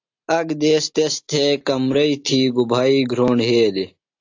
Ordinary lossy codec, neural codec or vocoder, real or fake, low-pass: AAC, 48 kbps; none; real; 7.2 kHz